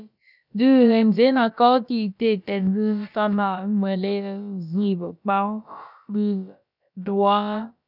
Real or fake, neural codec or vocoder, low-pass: fake; codec, 16 kHz, about 1 kbps, DyCAST, with the encoder's durations; 5.4 kHz